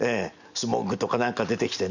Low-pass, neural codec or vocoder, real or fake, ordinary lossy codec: 7.2 kHz; none; real; none